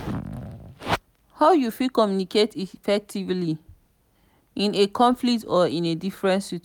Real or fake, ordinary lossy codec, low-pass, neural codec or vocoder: real; none; 19.8 kHz; none